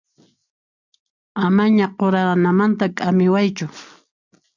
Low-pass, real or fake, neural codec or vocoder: 7.2 kHz; real; none